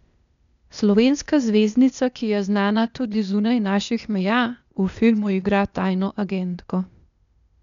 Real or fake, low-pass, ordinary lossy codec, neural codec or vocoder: fake; 7.2 kHz; none; codec, 16 kHz, 0.8 kbps, ZipCodec